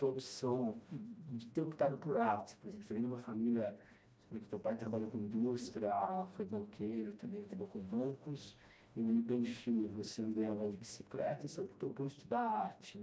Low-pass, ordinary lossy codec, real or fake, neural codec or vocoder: none; none; fake; codec, 16 kHz, 1 kbps, FreqCodec, smaller model